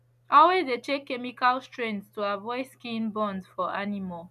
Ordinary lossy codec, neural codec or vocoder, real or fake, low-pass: none; none; real; 14.4 kHz